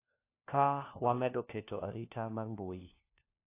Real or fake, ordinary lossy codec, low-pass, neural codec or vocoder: fake; AAC, 24 kbps; 3.6 kHz; codec, 16 kHz, 1 kbps, FunCodec, trained on LibriTTS, 50 frames a second